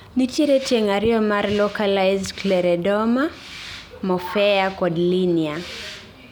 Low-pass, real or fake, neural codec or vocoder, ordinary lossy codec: none; real; none; none